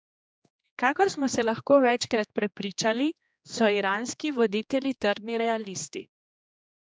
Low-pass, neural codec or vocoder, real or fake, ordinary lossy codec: none; codec, 16 kHz, 2 kbps, X-Codec, HuBERT features, trained on general audio; fake; none